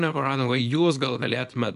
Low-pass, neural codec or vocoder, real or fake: 10.8 kHz; codec, 24 kHz, 0.9 kbps, WavTokenizer, small release; fake